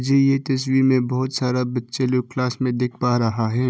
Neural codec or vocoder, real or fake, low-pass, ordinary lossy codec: none; real; none; none